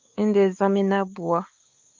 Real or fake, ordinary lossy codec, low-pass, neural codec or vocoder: fake; Opus, 24 kbps; 7.2 kHz; codec, 16 kHz, 4 kbps, X-Codec, WavLM features, trained on Multilingual LibriSpeech